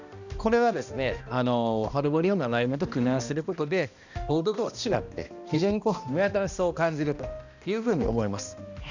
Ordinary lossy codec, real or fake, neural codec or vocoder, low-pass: none; fake; codec, 16 kHz, 1 kbps, X-Codec, HuBERT features, trained on balanced general audio; 7.2 kHz